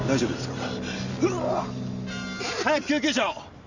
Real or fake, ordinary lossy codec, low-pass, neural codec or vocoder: real; none; 7.2 kHz; none